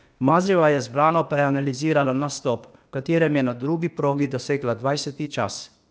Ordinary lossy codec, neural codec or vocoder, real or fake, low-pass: none; codec, 16 kHz, 0.8 kbps, ZipCodec; fake; none